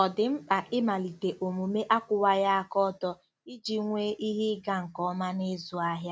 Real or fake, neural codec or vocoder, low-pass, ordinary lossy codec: real; none; none; none